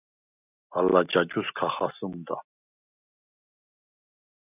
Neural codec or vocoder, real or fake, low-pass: none; real; 3.6 kHz